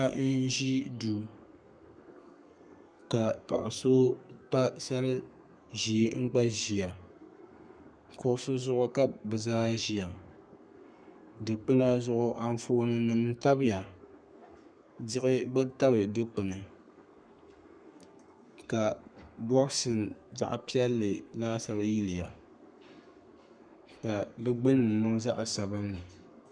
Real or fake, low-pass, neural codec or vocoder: fake; 9.9 kHz; codec, 32 kHz, 1.9 kbps, SNAC